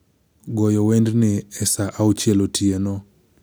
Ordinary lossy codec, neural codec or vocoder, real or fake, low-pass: none; none; real; none